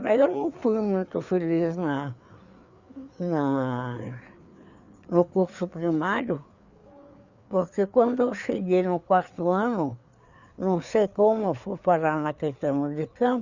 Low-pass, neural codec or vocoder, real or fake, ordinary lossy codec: 7.2 kHz; codec, 16 kHz, 4 kbps, FreqCodec, larger model; fake; Opus, 64 kbps